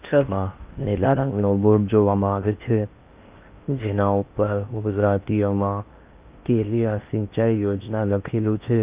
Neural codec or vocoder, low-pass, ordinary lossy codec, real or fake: codec, 16 kHz in and 24 kHz out, 0.6 kbps, FocalCodec, streaming, 4096 codes; 3.6 kHz; Opus, 24 kbps; fake